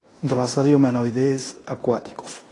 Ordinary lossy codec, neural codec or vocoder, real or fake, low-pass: AAC, 32 kbps; codec, 16 kHz in and 24 kHz out, 0.9 kbps, LongCat-Audio-Codec, fine tuned four codebook decoder; fake; 10.8 kHz